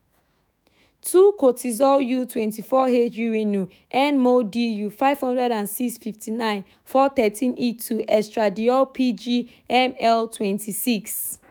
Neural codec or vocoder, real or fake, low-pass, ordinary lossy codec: autoencoder, 48 kHz, 128 numbers a frame, DAC-VAE, trained on Japanese speech; fake; none; none